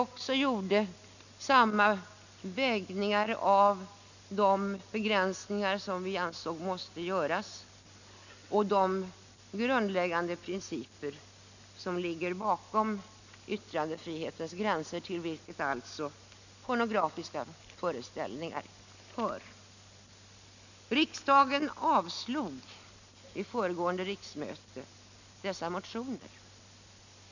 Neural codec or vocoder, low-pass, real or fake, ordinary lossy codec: none; 7.2 kHz; real; none